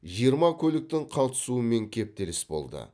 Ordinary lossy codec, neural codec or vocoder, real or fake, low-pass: none; none; real; none